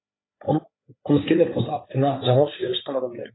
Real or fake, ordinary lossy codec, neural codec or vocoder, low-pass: fake; AAC, 16 kbps; codec, 16 kHz, 4 kbps, FreqCodec, larger model; 7.2 kHz